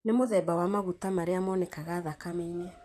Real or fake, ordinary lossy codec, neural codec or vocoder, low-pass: fake; none; vocoder, 44.1 kHz, 128 mel bands, Pupu-Vocoder; 14.4 kHz